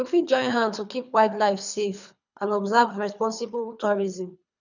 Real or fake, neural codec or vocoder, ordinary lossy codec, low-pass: fake; codec, 24 kHz, 3 kbps, HILCodec; none; 7.2 kHz